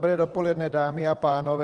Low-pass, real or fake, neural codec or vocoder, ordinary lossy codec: 9.9 kHz; fake; vocoder, 22.05 kHz, 80 mel bands, WaveNeXt; Opus, 24 kbps